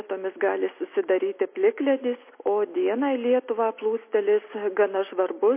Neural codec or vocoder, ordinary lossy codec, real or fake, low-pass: none; MP3, 24 kbps; real; 3.6 kHz